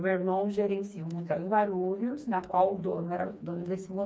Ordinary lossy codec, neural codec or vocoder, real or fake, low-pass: none; codec, 16 kHz, 2 kbps, FreqCodec, smaller model; fake; none